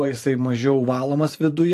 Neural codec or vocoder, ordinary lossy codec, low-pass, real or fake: vocoder, 44.1 kHz, 128 mel bands every 512 samples, BigVGAN v2; AAC, 64 kbps; 14.4 kHz; fake